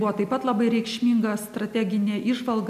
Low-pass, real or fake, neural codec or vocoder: 14.4 kHz; real; none